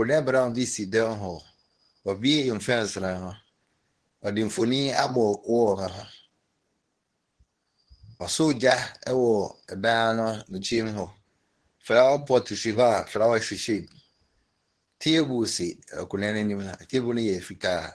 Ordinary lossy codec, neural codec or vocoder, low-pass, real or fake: Opus, 16 kbps; codec, 24 kHz, 0.9 kbps, WavTokenizer, medium speech release version 2; 10.8 kHz; fake